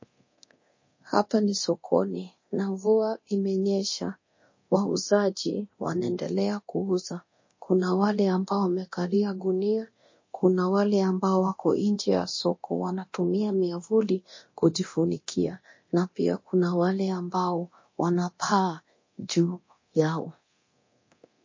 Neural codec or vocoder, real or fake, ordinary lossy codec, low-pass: codec, 24 kHz, 0.9 kbps, DualCodec; fake; MP3, 32 kbps; 7.2 kHz